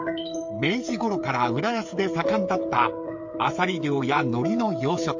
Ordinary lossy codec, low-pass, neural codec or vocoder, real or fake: MP3, 48 kbps; 7.2 kHz; codec, 16 kHz, 8 kbps, FreqCodec, smaller model; fake